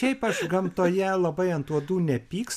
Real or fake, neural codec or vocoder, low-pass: real; none; 14.4 kHz